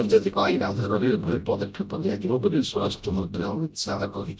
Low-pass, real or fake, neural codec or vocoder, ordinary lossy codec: none; fake; codec, 16 kHz, 0.5 kbps, FreqCodec, smaller model; none